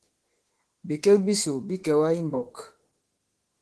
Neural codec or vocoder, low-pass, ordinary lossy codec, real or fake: autoencoder, 48 kHz, 32 numbers a frame, DAC-VAE, trained on Japanese speech; 10.8 kHz; Opus, 16 kbps; fake